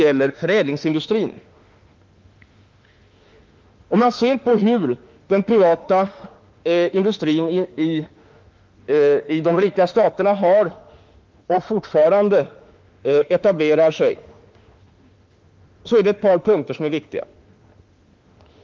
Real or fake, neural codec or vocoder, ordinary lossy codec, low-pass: fake; autoencoder, 48 kHz, 32 numbers a frame, DAC-VAE, trained on Japanese speech; Opus, 32 kbps; 7.2 kHz